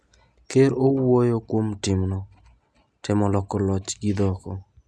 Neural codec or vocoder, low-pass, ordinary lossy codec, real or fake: none; none; none; real